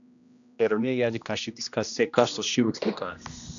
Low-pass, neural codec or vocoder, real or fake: 7.2 kHz; codec, 16 kHz, 1 kbps, X-Codec, HuBERT features, trained on general audio; fake